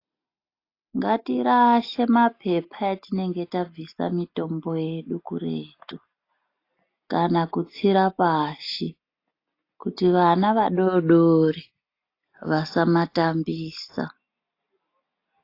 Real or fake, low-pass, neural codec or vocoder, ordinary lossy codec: real; 5.4 kHz; none; AAC, 32 kbps